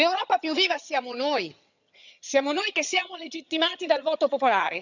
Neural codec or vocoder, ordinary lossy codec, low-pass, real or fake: vocoder, 22.05 kHz, 80 mel bands, HiFi-GAN; none; 7.2 kHz; fake